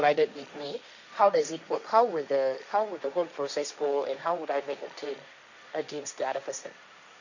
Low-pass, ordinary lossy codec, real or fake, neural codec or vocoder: 7.2 kHz; none; fake; codec, 16 kHz, 1.1 kbps, Voila-Tokenizer